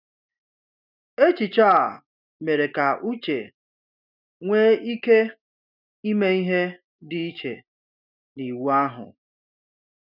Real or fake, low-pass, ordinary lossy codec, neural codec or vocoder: real; 5.4 kHz; none; none